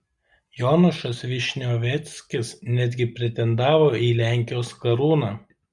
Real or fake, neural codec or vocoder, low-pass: real; none; 10.8 kHz